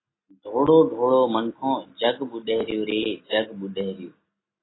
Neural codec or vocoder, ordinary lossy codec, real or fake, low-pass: none; AAC, 16 kbps; real; 7.2 kHz